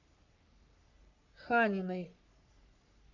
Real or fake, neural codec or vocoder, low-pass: fake; codec, 44.1 kHz, 3.4 kbps, Pupu-Codec; 7.2 kHz